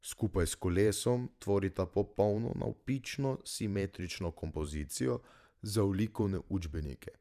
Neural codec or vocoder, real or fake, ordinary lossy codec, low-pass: vocoder, 44.1 kHz, 128 mel bands every 512 samples, BigVGAN v2; fake; none; 14.4 kHz